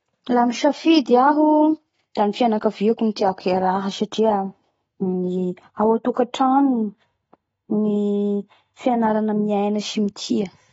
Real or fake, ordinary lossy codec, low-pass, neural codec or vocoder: real; AAC, 24 kbps; 19.8 kHz; none